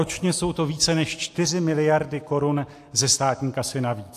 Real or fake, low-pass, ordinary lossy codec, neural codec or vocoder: real; 14.4 kHz; AAC, 64 kbps; none